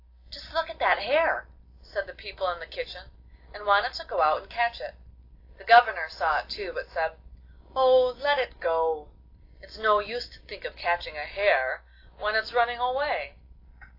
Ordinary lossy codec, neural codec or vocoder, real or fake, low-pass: AAC, 32 kbps; none; real; 5.4 kHz